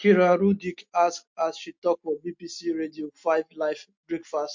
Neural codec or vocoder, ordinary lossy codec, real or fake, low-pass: none; MP3, 48 kbps; real; 7.2 kHz